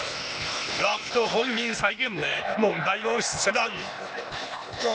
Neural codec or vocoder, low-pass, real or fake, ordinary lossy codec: codec, 16 kHz, 0.8 kbps, ZipCodec; none; fake; none